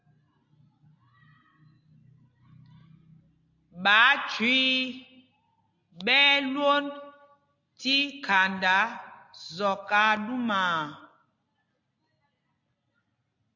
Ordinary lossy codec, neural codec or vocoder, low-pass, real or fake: AAC, 48 kbps; none; 7.2 kHz; real